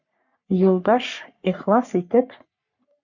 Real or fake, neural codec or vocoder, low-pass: fake; codec, 44.1 kHz, 3.4 kbps, Pupu-Codec; 7.2 kHz